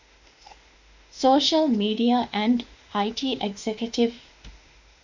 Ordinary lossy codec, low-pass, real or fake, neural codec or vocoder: Opus, 64 kbps; 7.2 kHz; fake; autoencoder, 48 kHz, 32 numbers a frame, DAC-VAE, trained on Japanese speech